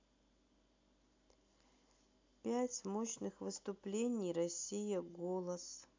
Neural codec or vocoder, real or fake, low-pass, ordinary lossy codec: none; real; 7.2 kHz; MP3, 64 kbps